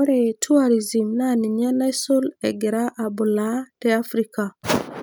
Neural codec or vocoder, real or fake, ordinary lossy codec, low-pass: none; real; none; none